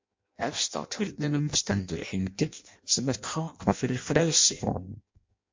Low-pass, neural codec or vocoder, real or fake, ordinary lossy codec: 7.2 kHz; codec, 16 kHz in and 24 kHz out, 0.6 kbps, FireRedTTS-2 codec; fake; MP3, 48 kbps